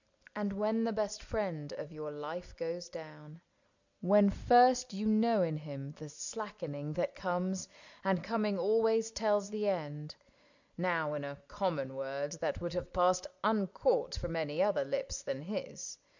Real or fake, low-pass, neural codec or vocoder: real; 7.2 kHz; none